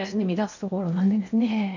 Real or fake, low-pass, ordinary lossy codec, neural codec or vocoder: fake; 7.2 kHz; none; codec, 16 kHz in and 24 kHz out, 0.8 kbps, FocalCodec, streaming, 65536 codes